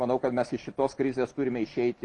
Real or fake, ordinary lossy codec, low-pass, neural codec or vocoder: real; Opus, 24 kbps; 10.8 kHz; none